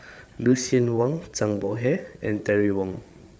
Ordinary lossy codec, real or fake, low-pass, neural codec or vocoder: none; fake; none; codec, 16 kHz, 8 kbps, FreqCodec, larger model